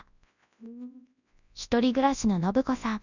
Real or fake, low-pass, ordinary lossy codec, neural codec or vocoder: fake; 7.2 kHz; none; codec, 24 kHz, 0.9 kbps, WavTokenizer, large speech release